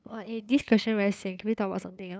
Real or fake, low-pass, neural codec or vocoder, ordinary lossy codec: fake; none; codec, 16 kHz, 8 kbps, FunCodec, trained on LibriTTS, 25 frames a second; none